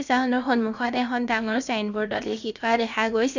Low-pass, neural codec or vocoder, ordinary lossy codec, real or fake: 7.2 kHz; codec, 16 kHz, about 1 kbps, DyCAST, with the encoder's durations; none; fake